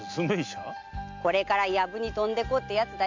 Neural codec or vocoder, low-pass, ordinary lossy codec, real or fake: none; 7.2 kHz; MP3, 48 kbps; real